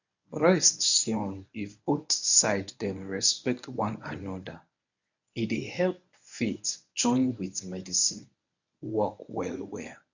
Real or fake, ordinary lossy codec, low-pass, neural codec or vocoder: fake; none; 7.2 kHz; codec, 24 kHz, 0.9 kbps, WavTokenizer, medium speech release version 1